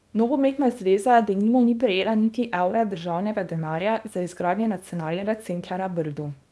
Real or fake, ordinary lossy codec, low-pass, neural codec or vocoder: fake; none; none; codec, 24 kHz, 0.9 kbps, WavTokenizer, small release